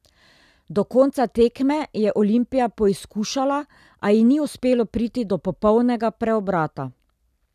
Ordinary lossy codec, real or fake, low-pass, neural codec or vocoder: none; real; 14.4 kHz; none